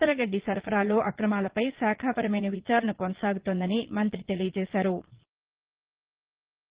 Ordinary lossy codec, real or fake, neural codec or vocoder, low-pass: Opus, 16 kbps; fake; vocoder, 22.05 kHz, 80 mel bands, Vocos; 3.6 kHz